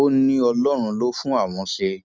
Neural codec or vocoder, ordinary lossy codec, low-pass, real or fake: none; none; none; real